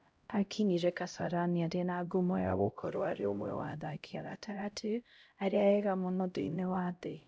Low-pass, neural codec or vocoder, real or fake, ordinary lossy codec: none; codec, 16 kHz, 0.5 kbps, X-Codec, HuBERT features, trained on LibriSpeech; fake; none